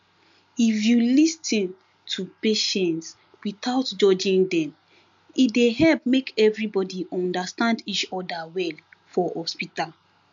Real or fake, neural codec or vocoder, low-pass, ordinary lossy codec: real; none; 7.2 kHz; MP3, 64 kbps